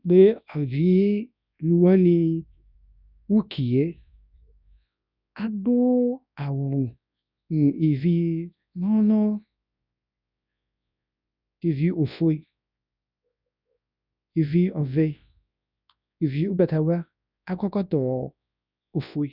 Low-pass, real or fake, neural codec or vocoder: 5.4 kHz; fake; codec, 24 kHz, 0.9 kbps, WavTokenizer, large speech release